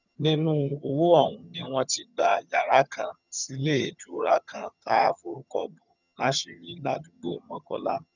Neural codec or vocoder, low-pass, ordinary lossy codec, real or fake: vocoder, 22.05 kHz, 80 mel bands, HiFi-GAN; 7.2 kHz; none; fake